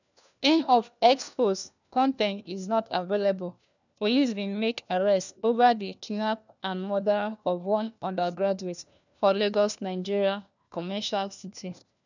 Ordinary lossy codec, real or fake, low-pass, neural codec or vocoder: none; fake; 7.2 kHz; codec, 16 kHz, 1 kbps, FunCodec, trained on LibriTTS, 50 frames a second